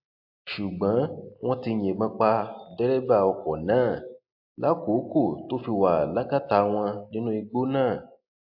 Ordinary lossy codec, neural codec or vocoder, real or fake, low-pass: AAC, 48 kbps; none; real; 5.4 kHz